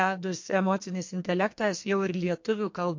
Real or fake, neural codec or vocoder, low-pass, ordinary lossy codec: fake; codec, 44.1 kHz, 2.6 kbps, SNAC; 7.2 kHz; MP3, 48 kbps